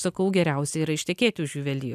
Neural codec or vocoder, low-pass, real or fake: none; 14.4 kHz; real